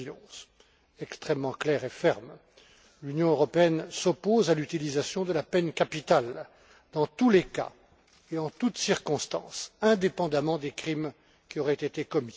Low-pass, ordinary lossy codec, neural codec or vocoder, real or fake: none; none; none; real